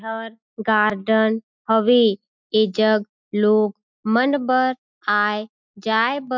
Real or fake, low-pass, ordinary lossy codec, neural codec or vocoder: real; 7.2 kHz; MP3, 64 kbps; none